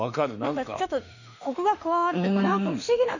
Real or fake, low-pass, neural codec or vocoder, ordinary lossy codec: fake; 7.2 kHz; autoencoder, 48 kHz, 32 numbers a frame, DAC-VAE, trained on Japanese speech; none